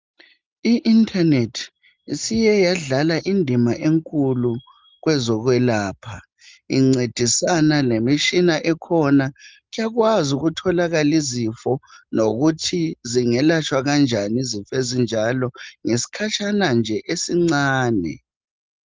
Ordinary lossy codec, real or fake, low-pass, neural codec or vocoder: Opus, 32 kbps; real; 7.2 kHz; none